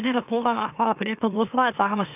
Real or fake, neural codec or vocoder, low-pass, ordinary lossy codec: fake; autoencoder, 44.1 kHz, a latent of 192 numbers a frame, MeloTTS; 3.6 kHz; none